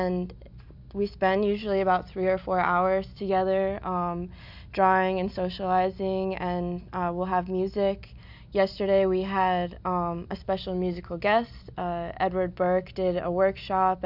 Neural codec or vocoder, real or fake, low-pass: none; real; 5.4 kHz